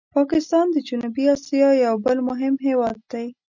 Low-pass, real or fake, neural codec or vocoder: 7.2 kHz; real; none